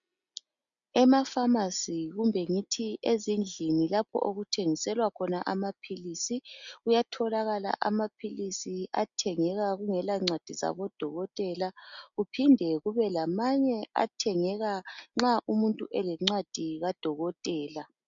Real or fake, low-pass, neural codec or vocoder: real; 7.2 kHz; none